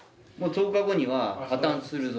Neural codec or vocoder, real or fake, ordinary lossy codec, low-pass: none; real; none; none